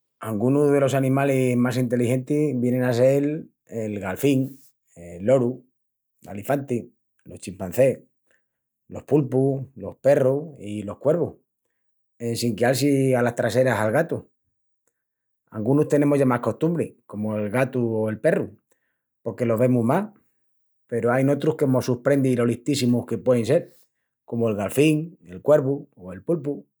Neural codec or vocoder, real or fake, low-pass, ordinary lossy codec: none; real; none; none